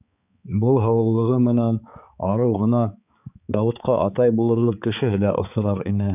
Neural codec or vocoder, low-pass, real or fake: codec, 16 kHz, 4 kbps, X-Codec, HuBERT features, trained on balanced general audio; 3.6 kHz; fake